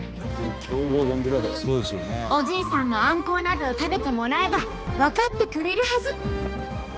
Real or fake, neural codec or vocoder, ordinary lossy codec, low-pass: fake; codec, 16 kHz, 2 kbps, X-Codec, HuBERT features, trained on balanced general audio; none; none